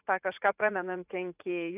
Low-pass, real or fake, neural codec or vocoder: 3.6 kHz; fake; vocoder, 44.1 kHz, 80 mel bands, Vocos